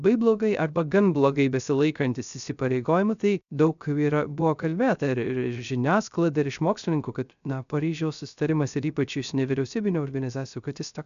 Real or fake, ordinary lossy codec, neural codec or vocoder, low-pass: fake; MP3, 96 kbps; codec, 16 kHz, 0.3 kbps, FocalCodec; 7.2 kHz